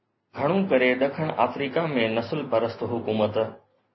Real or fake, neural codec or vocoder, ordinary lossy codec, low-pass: real; none; MP3, 24 kbps; 7.2 kHz